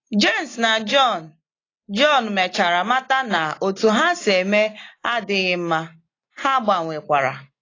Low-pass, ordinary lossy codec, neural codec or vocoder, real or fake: 7.2 kHz; AAC, 32 kbps; none; real